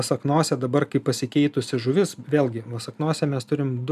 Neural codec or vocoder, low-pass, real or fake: none; 14.4 kHz; real